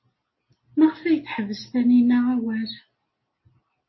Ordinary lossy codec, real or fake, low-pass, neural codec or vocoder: MP3, 24 kbps; real; 7.2 kHz; none